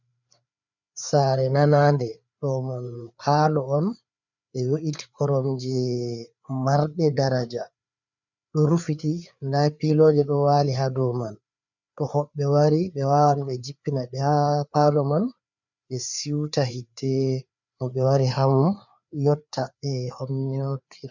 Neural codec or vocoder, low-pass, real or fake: codec, 16 kHz, 4 kbps, FreqCodec, larger model; 7.2 kHz; fake